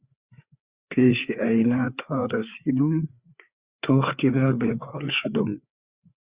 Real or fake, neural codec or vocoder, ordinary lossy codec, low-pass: fake; codec, 16 kHz, 4 kbps, FreqCodec, larger model; Opus, 64 kbps; 3.6 kHz